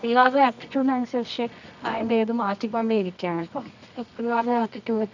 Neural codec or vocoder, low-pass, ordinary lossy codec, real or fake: codec, 24 kHz, 0.9 kbps, WavTokenizer, medium music audio release; 7.2 kHz; none; fake